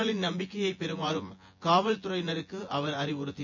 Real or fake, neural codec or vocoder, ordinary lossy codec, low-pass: fake; vocoder, 24 kHz, 100 mel bands, Vocos; MP3, 48 kbps; 7.2 kHz